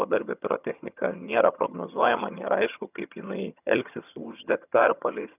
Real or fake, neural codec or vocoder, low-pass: fake; vocoder, 22.05 kHz, 80 mel bands, HiFi-GAN; 3.6 kHz